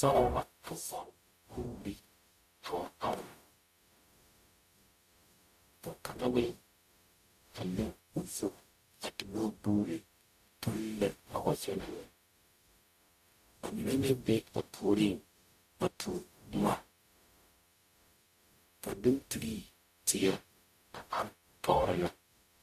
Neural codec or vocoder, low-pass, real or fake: codec, 44.1 kHz, 0.9 kbps, DAC; 14.4 kHz; fake